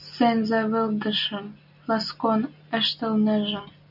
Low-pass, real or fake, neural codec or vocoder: 5.4 kHz; real; none